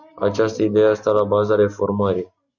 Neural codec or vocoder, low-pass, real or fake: none; 7.2 kHz; real